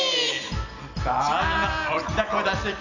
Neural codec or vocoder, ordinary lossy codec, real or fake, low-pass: none; none; real; 7.2 kHz